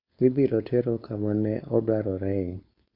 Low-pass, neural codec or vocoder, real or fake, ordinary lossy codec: 5.4 kHz; codec, 16 kHz, 4.8 kbps, FACodec; fake; AAC, 32 kbps